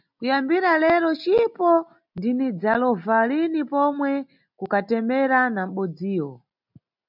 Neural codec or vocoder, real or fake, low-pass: none; real; 5.4 kHz